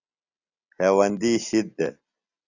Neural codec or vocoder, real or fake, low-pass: none; real; 7.2 kHz